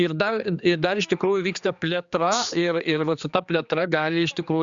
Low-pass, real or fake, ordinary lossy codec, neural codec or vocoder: 7.2 kHz; fake; Opus, 64 kbps; codec, 16 kHz, 2 kbps, X-Codec, HuBERT features, trained on general audio